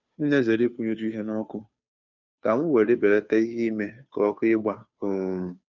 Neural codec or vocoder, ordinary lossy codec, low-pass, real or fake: codec, 16 kHz, 2 kbps, FunCodec, trained on Chinese and English, 25 frames a second; none; 7.2 kHz; fake